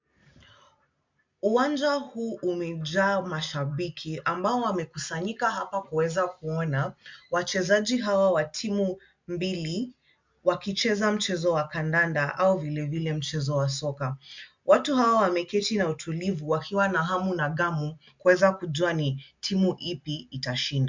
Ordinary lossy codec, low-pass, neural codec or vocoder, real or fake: MP3, 64 kbps; 7.2 kHz; none; real